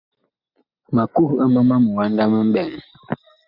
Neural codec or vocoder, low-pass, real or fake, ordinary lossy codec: none; 5.4 kHz; real; MP3, 48 kbps